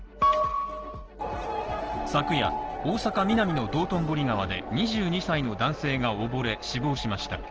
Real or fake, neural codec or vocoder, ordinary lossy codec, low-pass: real; none; Opus, 16 kbps; 7.2 kHz